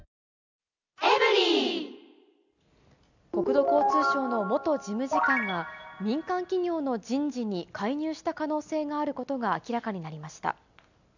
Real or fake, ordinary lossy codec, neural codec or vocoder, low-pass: real; none; none; 7.2 kHz